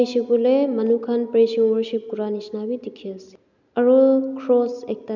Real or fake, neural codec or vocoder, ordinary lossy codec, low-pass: real; none; none; 7.2 kHz